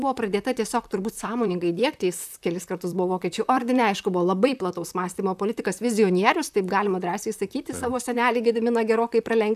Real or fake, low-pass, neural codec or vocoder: fake; 14.4 kHz; vocoder, 44.1 kHz, 128 mel bands, Pupu-Vocoder